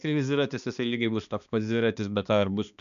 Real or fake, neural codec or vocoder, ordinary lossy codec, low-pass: fake; codec, 16 kHz, 2 kbps, X-Codec, HuBERT features, trained on balanced general audio; MP3, 96 kbps; 7.2 kHz